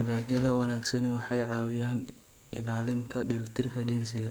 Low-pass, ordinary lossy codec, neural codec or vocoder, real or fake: none; none; codec, 44.1 kHz, 2.6 kbps, SNAC; fake